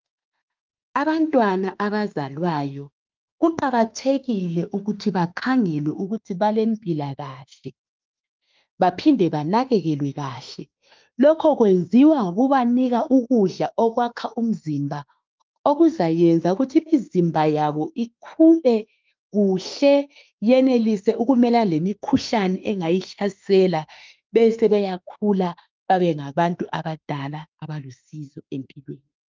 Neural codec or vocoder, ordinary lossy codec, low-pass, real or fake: autoencoder, 48 kHz, 32 numbers a frame, DAC-VAE, trained on Japanese speech; Opus, 24 kbps; 7.2 kHz; fake